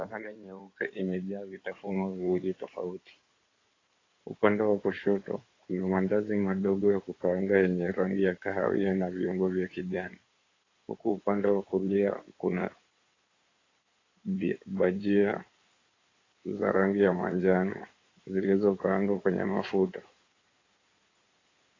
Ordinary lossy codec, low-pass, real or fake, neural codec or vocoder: AAC, 32 kbps; 7.2 kHz; fake; codec, 16 kHz in and 24 kHz out, 2.2 kbps, FireRedTTS-2 codec